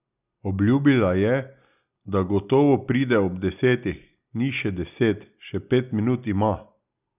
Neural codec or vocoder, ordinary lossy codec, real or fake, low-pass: none; none; real; 3.6 kHz